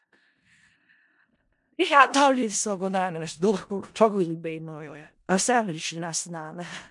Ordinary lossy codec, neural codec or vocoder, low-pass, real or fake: MP3, 64 kbps; codec, 16 kHz in and 24 kHz out, 0.4 kbps, LongCat-Audio-Codec, four codebook decoder; 10.8 kHz; fake